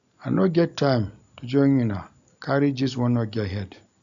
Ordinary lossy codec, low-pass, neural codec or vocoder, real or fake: none; 7.2 kHz; none; real